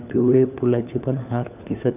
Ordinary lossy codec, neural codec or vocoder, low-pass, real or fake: none; codec, 16 kHz, 4 kbps, FunCodec, trained on LibriTTS, 50 frames a second; 3.6 kHz; fake